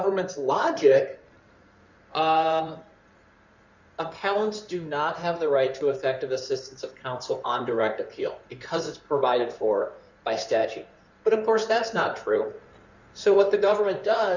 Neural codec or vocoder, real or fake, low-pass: codec, 16 kHz in and 24 kHz out, 2.2 kbps, FireRedTTS-2 codec; fake; 7.2 kHz